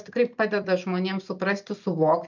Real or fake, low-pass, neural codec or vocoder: real; 7.2 kHz; none